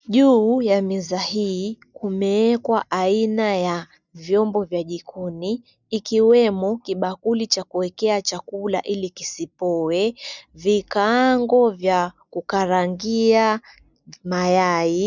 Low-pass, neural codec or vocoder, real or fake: 7.2 kHz; none; real